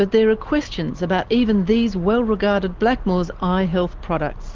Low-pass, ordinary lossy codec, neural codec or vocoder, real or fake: 7.2 kHz; Opus, 16 kbps; none; real